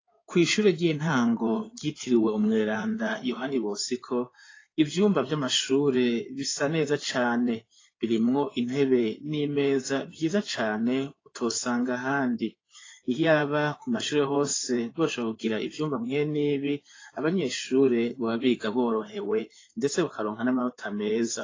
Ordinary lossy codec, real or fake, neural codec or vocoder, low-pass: AAC, 32 kbps; fake; codec, 16 kHz in and 24 kHz out, 2.2 kbps, FireRedTTS-2 codec; 7.2 kHz